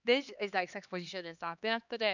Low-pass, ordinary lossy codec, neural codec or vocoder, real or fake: 7.2 kHz; none; codec, 16 kHz, 2 kbps, X-Codec, HuBERT features, trained on LibriSpeech; fake